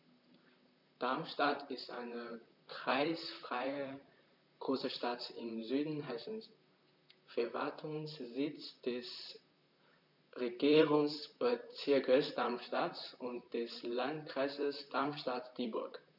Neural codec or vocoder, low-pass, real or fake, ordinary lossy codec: vocoder, 44.1 kHz, 128 mel bands, Pupu-Vocoder; 5.4 kHz; fake; none